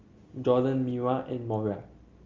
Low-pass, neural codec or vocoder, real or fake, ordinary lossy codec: 7.2 kHz; none; real; Opus, 32 kbps